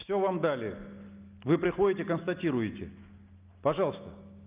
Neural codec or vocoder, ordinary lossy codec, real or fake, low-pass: none; Opus, 24 kbps; real; 3.6 kHz